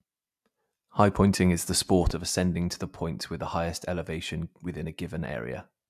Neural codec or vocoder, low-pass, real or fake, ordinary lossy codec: none; 14.4 kHz; real; AAC, 96 kbps